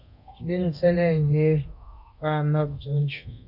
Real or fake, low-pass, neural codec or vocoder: fake; 5.4 kHz; codec, 24 kHz, 0.9 kbps, DualCodec